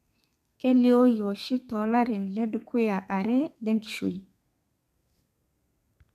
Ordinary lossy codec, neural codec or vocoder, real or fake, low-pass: none; codec, 32 kHz, 1.9 kbps, SNAC; fake; 14.4 kHz